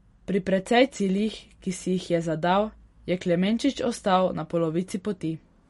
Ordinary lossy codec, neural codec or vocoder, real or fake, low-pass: MP3, 48 kbps; none; real; 19.8 kHz